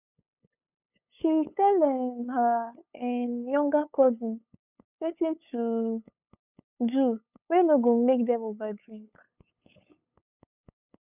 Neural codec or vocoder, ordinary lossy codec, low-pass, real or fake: codec, 16 kHz, 8 kbps, FunCodec, trained on LibriTTS, 25 frames a second; none; 3.6 kHz; fake